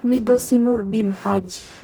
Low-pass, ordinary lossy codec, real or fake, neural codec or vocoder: none; none; fake; codec, 44.1 kHz, 0.9 kbps, DAC